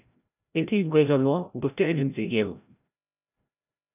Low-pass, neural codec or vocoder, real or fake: 3.6 kHz; codec, 16 kHz, 0.5 kbps, FreqCodec, larger model; fake